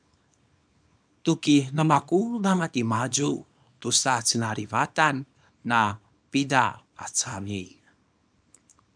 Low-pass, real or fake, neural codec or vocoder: 9.9 kHz; fake; codec, 24 kHz, 0.9 kbps, WavTokenizer, small release